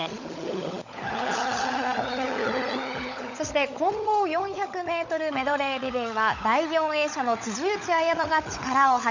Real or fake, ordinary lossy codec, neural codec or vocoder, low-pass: fake; none; codec, 16 kHz, 16 kbps, FunCodec, trained on LibriTTS, 50 frames a second; 7.2 kHz